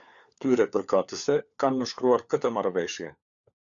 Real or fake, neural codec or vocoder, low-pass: fake; codec, 16 kHz, 4 kbps, FunCodec, trained on LibriTTS, 50 frames a second; 7.2 kHz